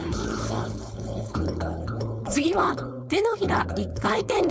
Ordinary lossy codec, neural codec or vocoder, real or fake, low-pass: none; codec, 16 kHz, 4.8 kbps, FACodec; fake; none